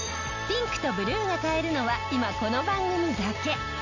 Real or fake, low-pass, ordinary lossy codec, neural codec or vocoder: real; 7.2 kHz; none; none